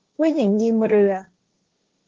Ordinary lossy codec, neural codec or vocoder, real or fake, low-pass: Opus, 16 kbps; codec, 16 kHz, 1.1 kbps, Voila-Tokenizer; fake; 7.2 kHz